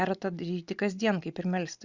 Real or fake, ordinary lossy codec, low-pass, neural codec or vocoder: real; Opus, 64 kbps; 7.2 kHz; none